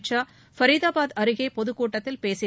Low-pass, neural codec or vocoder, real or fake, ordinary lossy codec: none; none; real; none